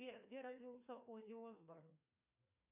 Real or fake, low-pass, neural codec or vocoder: fake; 3.6 kHz; codec, 16 kHz, 2 kbps, FreqCodec, larger model